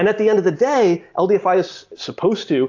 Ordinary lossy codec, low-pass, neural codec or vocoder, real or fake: AAC, 48 kbps; 7.2 kHz; none; real